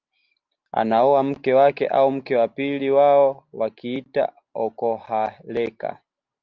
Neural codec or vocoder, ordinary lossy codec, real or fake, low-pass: none; Opus, 24 kbps; real; 7.2 kHz